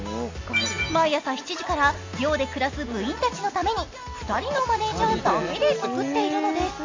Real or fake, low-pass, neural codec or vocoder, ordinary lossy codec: real; 7.2 kHz; none; MP3, 48 kbps